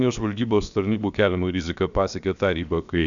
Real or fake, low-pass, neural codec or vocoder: fake; 7.2 kHz; codec, 16 kHz, 0.7 kbps, FocalCodec